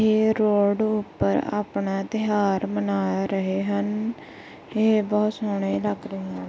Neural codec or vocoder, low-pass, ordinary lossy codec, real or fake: none; none; none; real